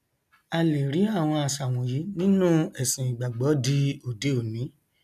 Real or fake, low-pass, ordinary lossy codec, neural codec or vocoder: real; 14.4 kHz; none; none